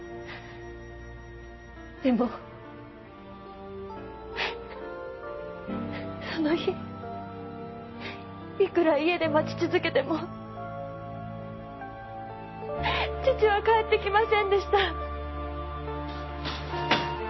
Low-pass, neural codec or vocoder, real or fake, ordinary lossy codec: 7.2 kHz; none; real; MP3, 24 kbps